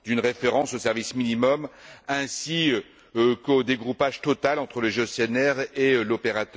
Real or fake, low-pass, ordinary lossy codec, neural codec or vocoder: real; none; none; none